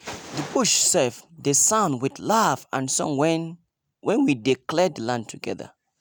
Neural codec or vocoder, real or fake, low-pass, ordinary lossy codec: none; real; none; none